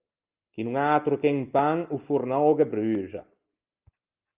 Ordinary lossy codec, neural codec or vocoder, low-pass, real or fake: Opus, 24 kbps; none; 3.6 kHz; real